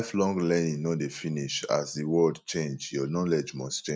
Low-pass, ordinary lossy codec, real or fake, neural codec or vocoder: none; none; real; none